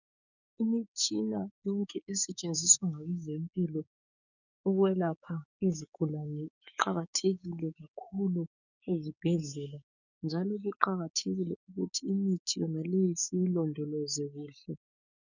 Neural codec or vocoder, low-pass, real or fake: codec, 16 kHz, 6 kbps, DAC; 7.2 kHz; fake